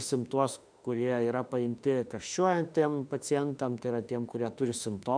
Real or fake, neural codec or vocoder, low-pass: fake; autoencoder, 48 kHz, 32 numbers a frame, DAC-VAE, trained on Japanese speech; 9.9 kHz